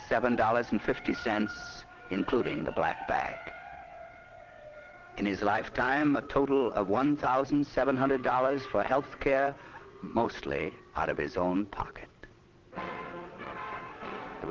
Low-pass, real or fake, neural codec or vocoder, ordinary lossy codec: 7.2 kHz; fake; vocoder, 22.05 kHz, 80 mel bands, WaveNeXt; Opus, 16 kbps